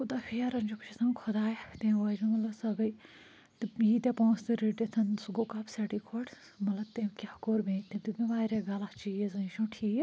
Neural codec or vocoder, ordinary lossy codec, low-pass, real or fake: none; none; none; real